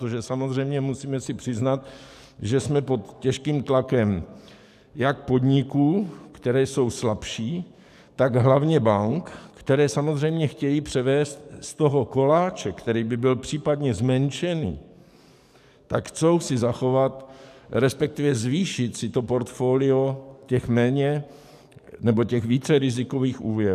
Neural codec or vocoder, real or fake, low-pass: codec, 44.1 kHz, 7.8 kbps, DAC; fake; 14.4 kHz